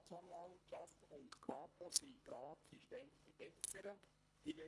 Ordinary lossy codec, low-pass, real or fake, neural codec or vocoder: none; none; fake; codec, 24 kHz, 1.5 kbps, HILCodec